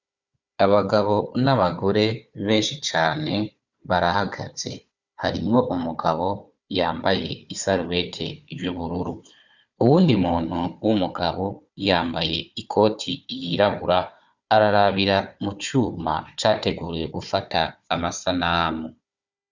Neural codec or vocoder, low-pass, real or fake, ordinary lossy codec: codec, 16 kHz, 4 kbps, FunCodec, trained on Chinese and English, 50 frames a second; 7.2 kHz; fake; Opus, 64 kbps